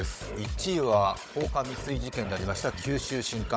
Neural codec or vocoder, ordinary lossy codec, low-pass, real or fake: codec, 16 kHz, 16 kbps, FunCodec, trained on Chinese and English, 50 frames a second; none; none; fake